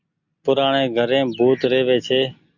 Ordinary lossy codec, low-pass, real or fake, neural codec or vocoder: Opus, 64 kbps; 7.2 kHz; real; none